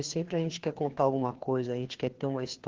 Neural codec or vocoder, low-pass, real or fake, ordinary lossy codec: codec, 16 kHz, 2 kbps, FreqCodec, larger model; 7.2 kHz; fake; Opus, 16 kbps